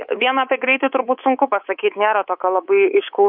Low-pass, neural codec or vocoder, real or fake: 5.4 kHz; codec, 24 kHz, 3.1 kbps, DualCodec; fake